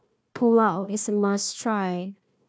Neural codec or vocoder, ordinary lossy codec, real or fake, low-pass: codec, 16 kHz, 1 kbps, FunCodec, trained on Chinese and English, 50 frames a second; none; fake; none